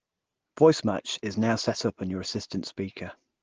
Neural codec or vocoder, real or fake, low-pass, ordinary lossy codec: none; real; 7.2 kHz; Opus, 16 kbps